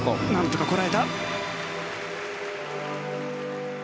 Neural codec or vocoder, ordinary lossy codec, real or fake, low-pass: none; none; real; none